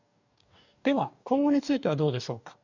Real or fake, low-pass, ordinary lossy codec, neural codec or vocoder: fake; 7.2 kHz; none; codec, 44.1 kHz, 2.6 kbps, DAC